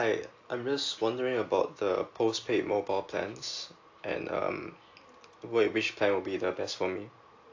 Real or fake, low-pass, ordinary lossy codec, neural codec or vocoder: real; 7.2 kHz; AAC, 48 kbps; none